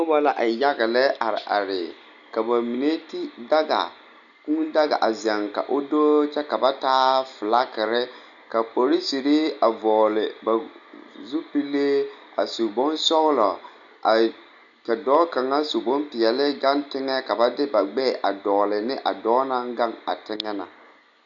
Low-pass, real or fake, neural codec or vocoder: 7.2 kHz; real; none